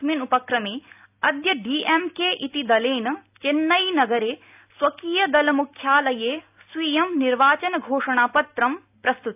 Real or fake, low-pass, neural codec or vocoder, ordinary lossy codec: real; 3.6 kHz; none; AAC, 32 kbps